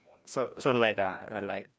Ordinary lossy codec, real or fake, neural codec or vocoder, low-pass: none; fake; codec, 16 kHz, 1 kbps, FreqCodec, larger model; none